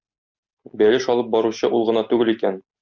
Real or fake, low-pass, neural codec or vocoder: real; 7.2 kHz; none